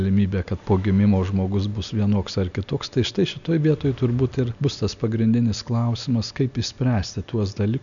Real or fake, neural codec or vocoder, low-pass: real; none; 7.2 kHz